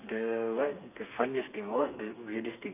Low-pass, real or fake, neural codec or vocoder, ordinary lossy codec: 3.6 kHz; fake; codec, 32 kHz, 1.9 kbps, SNAC; none